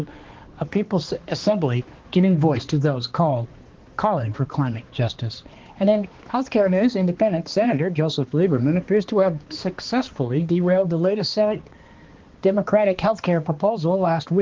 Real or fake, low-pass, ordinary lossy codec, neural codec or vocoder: fake; 7.2 kHz; Opus, 16 kbps; codec, 16 kHz, 2 kbps, X-Codec, HuBERT features, trained on balanced general audio